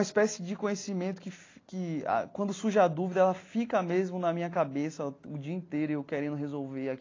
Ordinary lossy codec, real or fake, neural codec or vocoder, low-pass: AAC, 32 kbps; real; none; 7.2 kHz